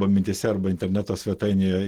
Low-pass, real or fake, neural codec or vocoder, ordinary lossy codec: 19.8 kHz; real; none; Opus, 16 kbps